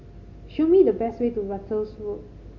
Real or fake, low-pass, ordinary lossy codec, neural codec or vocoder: real; 7.2 kHz; MP3, 48 kbps; none